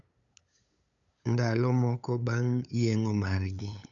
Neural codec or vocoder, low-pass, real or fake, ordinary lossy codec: codec, 16 kHz, 8 kbps, FunCodec, trained on LibriTTS, 25 frames a second; 7.2 kHz; fake; none